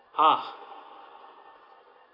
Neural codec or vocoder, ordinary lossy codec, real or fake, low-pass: none; none; real; 5.4 kHz